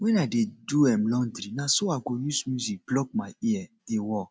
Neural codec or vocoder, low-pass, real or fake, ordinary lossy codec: none; none; real; none